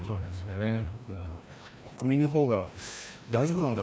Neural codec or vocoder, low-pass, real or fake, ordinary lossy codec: codec, 16 kHz, 1 kbps, FreqCodec, larger model; none; fake; none